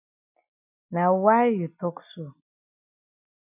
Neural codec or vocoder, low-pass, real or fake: none; 3.6 kHz; real